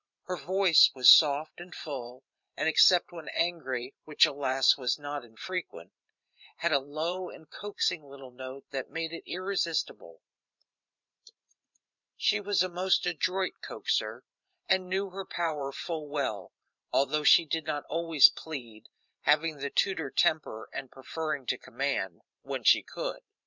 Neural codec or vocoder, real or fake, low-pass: vocoder, 44.1 kHz, 128 mel bands every 512 samples, BigVGAN v2; fake; 7.2 kHz